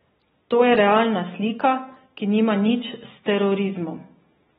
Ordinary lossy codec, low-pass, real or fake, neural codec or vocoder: AAC, 16 kbps; 19.8 kHz; real; none